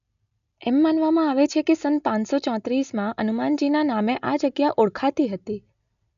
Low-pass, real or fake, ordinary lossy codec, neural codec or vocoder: 7.2 kHz; real; none; none